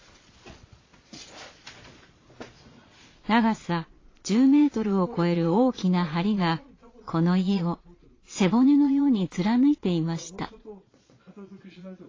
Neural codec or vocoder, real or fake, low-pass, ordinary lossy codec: vocoder, 22.05 kHz, 80 mel bands, Vocos; fake; 7.2 kHz; AAC, 32 kbps